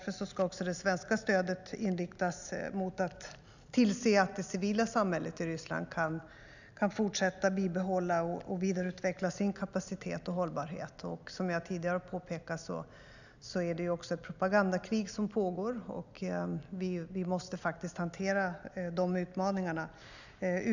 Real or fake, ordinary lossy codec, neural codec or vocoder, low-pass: real; none; none; 7.2 kHz